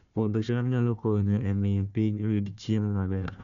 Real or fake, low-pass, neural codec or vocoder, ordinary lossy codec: fake; 7.2 kHz; codec, 16 kHz, 1 kbps, FunCodec, trained on Chinese and English, 50 frames a second; none